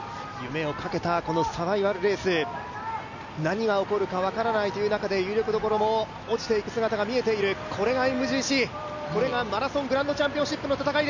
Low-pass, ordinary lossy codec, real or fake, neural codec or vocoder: 7.2 kHz; none; real; none